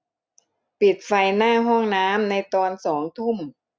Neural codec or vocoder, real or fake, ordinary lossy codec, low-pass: none; real; none; none